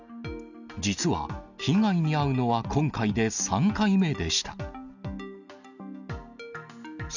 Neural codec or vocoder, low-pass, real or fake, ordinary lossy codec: none; 7.2 kHz; real; none